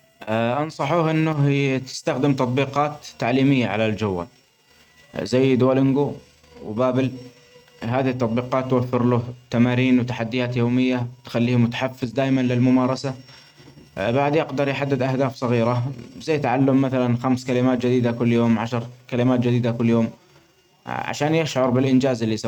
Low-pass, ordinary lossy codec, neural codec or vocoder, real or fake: 19.8 kHz; none; none; real